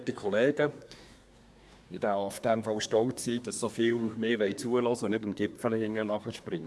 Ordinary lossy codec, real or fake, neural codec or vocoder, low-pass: none; fake; codec, 24 kHz, 1 kbps, SNAC; none